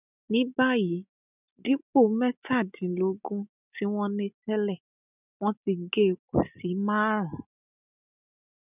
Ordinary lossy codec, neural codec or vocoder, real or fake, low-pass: none; none; real; 3.6 kHz